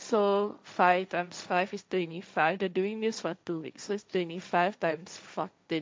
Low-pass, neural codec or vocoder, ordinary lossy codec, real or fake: none; codec, 16 kHz, 1.1 kbps, Voila-Tokenizer; none; fake